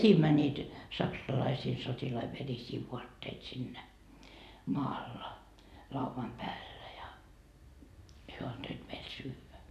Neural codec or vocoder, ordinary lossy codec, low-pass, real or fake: none; none; 14.4 kHz; real